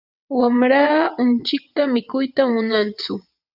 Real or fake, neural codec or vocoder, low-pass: fake; vocoder, 22.05 kHz, 80 mel bands, WaveNeXt; 5.4 kHz